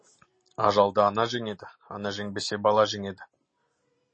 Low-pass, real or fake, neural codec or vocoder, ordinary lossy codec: 9.9 kHz; real; none; MP3, 32 kbps